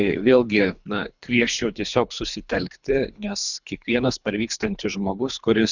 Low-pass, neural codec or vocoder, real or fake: 7.2 kHz; codec, 24 kHz, 3 kbps, HILCodec; fake